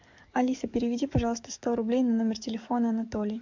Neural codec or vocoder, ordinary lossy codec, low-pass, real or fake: codec, 44.1 kHz, 7.8 kbps, DAC; MP3, 64 kbps; 7.2 kHz; fake